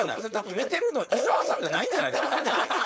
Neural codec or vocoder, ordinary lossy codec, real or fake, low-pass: codec, 16 kHz, 4.8 kbps, FACodec; none; fake; none